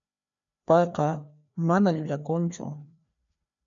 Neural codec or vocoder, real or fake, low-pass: codec, 16 kHz, 2 kbps, FreqCodec, larger model; fake; 7.2 kHz